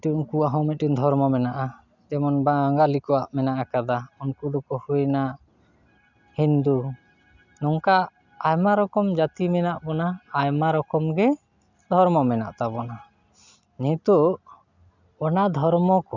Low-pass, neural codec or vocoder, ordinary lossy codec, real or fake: 7.2 kHz; none; none; real